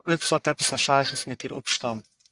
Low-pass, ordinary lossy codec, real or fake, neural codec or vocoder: 10.8 kHz; Opus, 64 kbps; fake; codec, 44.1 kHz, 1.7 kbps, Pupu-Codec